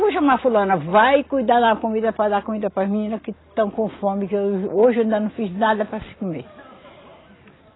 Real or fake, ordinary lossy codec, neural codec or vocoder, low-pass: real; AAC, 16 kbps; none; 7.2 kHz